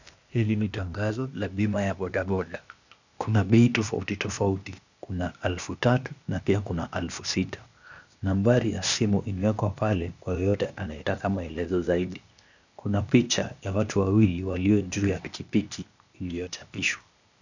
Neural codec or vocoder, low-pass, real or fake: codec, 16 kHz, 0.8 kbps, ZipCodec; 7.2 kHz; fake